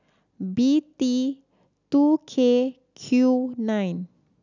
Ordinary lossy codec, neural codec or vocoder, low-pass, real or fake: none; none; 7.2 kHz; real